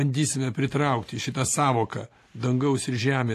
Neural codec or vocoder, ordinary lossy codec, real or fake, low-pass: none; AAC, 48 kbps; real; 14.4 kHz